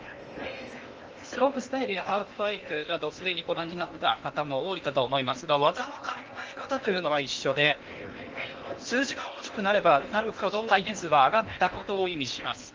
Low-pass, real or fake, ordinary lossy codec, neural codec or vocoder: 7.2 kHz; fake; Opus, 24 kbps; codec, 16 kHz in and 24 kHz out, 0.8 kbps, FocalCodec, streaming, 65536 codes